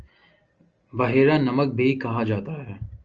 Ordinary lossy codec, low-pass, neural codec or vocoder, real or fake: Opus, 24 kbps; 7.2 kHz; none; real